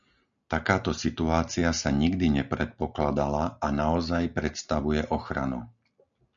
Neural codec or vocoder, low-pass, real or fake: none; 7.2 kHz; real